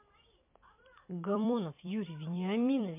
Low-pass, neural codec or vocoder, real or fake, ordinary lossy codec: 3.6 kHz; vocoder, 44.1 kHz, 128 mel bands every 256 samples, BigVGAN v2; fake; MP3, 32 kbps